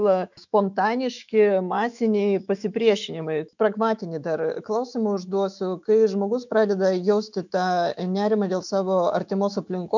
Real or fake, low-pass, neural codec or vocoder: fake; 7.2 kHz; autoencoder, 48 kHz, 128 numbers a frame, DAC-VAE, trained on Japanese speech